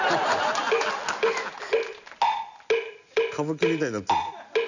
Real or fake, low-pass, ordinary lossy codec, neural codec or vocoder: real; 7.2 kHz; none; none